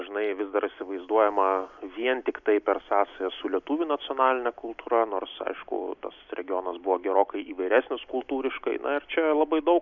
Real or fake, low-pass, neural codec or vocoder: real; 7.2 kHz; none